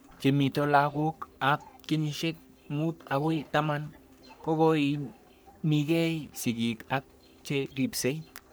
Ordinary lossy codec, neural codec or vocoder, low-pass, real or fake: none; codec, 44.1 kHz, 3.4 kbps, Pupu-Codec; none; fake